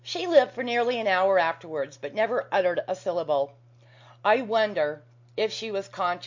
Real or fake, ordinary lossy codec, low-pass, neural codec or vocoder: real; MP3, 48 kbps; 7.2 kHz; none